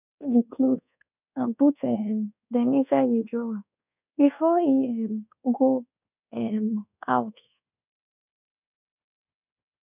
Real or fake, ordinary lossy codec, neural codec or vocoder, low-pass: fake; none; codec, 24 kHz, 1.2 kbps, DualCodec; 3.6 kHz